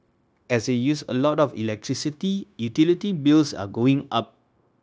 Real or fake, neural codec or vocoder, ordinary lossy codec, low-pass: fake; codec, 16 kHz, 0.9 kbps, LongCat-Audio-Codec; none; none